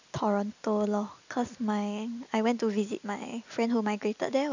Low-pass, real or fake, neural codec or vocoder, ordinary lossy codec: 7.2 kHz; real; none; none